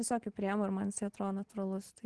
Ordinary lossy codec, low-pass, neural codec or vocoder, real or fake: Opus, 16 kbps; 10.8 kHz; none; real